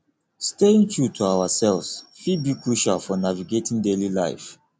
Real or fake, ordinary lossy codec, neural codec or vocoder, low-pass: real; none; none; none